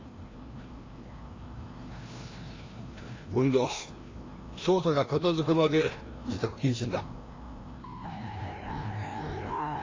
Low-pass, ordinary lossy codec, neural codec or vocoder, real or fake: 7.2 kHz; AAC, 32 kbps; codec, 16 kHz, 1 kbps, FreqCodec, larger model; fake